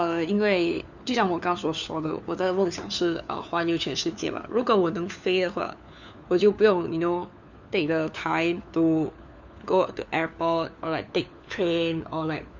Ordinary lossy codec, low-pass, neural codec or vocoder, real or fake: none; 7.2 kHz; codec, 16 kHz, 4 kbps, FunCodec, trained on LibriTTS, 50 frames a second; fake